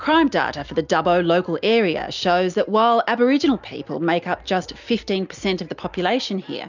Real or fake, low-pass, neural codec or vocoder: real; 7.2 kHz; none